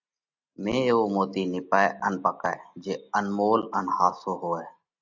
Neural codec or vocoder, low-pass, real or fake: none; 7.2 kHz; real